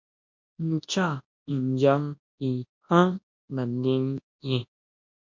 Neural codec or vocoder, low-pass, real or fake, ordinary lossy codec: codec, 24 kHz, 0.9 kbps, WavTokenizer, large speech release; 7.2 kHz; fake; MP3, 48 kbps